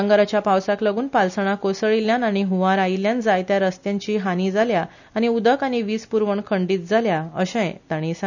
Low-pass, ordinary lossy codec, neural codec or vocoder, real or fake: 7.2 kHz; none; none; real